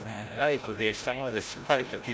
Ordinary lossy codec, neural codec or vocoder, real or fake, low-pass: none; codec, 16 kHz, 0.5 kbps, FreqCodec, larger model; fake; none